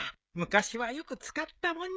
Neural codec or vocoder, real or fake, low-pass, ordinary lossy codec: codec, 16 kHz, 16 kbps, FreqCodec, smaller model; fake; none; none